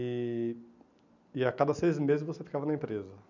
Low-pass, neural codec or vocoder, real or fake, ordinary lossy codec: 7.2 kHz; none; real; none